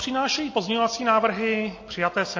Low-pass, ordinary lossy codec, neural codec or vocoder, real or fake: 7.2 kHz; MP3, 32 kbps; none; real